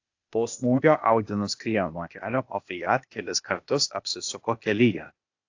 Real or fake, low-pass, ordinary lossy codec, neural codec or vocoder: fake; 7.2 kHz; AAC, 48 kbps; codec, 16 kHz, 0.8 kbps, ZipCodec